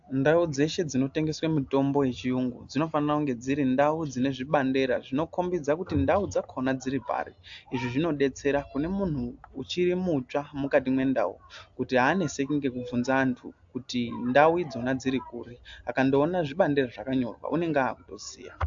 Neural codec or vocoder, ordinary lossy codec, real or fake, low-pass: none; AAC, 64 kbps; real; 7.2 kHz